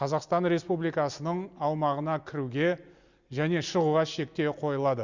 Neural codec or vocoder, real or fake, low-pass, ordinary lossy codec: none; real; 7.2 kHz; Opus, 64 kbps